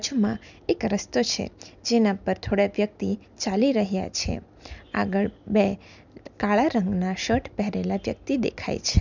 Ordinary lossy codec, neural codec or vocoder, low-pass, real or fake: none; none; 7.2 kHz; real